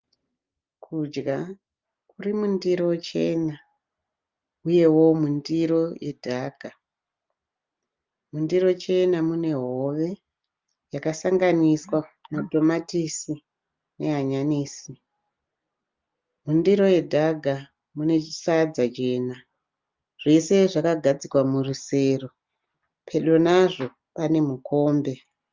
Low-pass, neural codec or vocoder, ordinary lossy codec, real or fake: 7.2 kHz; none; Opus, 24 kbps; real